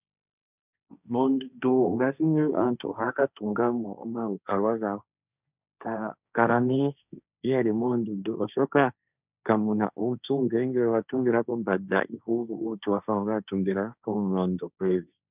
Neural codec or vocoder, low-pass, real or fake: codec, 16 kHz, 1.1 kbps, Voila-Tokenizer; 3.6 kHz; fake